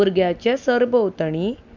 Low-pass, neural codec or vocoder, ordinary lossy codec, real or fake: 7.2 kHz; none; none; real